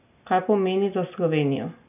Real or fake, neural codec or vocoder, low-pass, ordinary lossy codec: real; none; 3.6 kHz; none